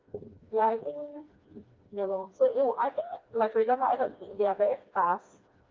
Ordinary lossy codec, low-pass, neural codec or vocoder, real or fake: Opus, 24 kbps; 7.2 kHz; codec, 16 kHz, 2 kbps, FreqCodec, smaller model; fake